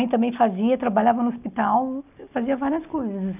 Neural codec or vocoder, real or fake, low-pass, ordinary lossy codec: none; real; 3.6 kHz; none